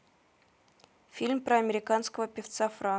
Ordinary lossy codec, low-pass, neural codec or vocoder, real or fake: none; none; none; real